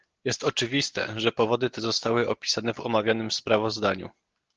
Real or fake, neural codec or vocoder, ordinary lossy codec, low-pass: real; none; Opus, 16 kbps; 7.2 kHz